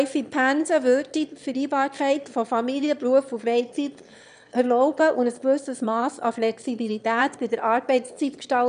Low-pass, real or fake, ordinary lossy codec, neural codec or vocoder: 9.9 kHz; fake; none; autoencoder, 22.05 kHz, a latent of 192 numbers a frame, VITS, trained on one speaker